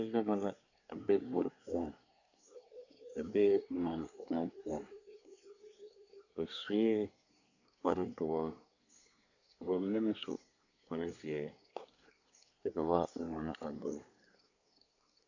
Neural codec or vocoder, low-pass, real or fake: codec, 24 kHz, 1 kbps, SNAC; 7.2 kHz; fake